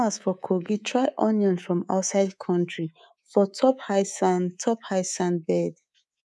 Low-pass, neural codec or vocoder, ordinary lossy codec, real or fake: none; codec, 24 kHz, 3.1 kbps, DualCodec; none; fake